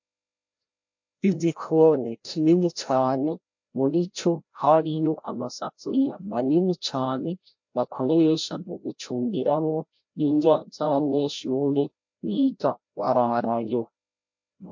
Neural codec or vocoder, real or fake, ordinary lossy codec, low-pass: codec, 16 kHz, 0.5 kbps, FreqCodec, larger model; fake; MP3, 48 kbps; 7.2 kHz